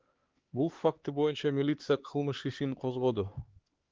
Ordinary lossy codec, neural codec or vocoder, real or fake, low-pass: Opus, 16 kbps; codec, 16 kHz, 4 kbps, X-Codec, HuBERT features, trained on LibriSpeech; fake; 7.2 kHz